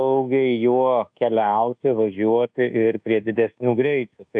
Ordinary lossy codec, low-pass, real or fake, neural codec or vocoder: AAC, 64 kbps; 9.9 kHz; fake; codec, 24 kHz, 1.2 kbps, DualCodec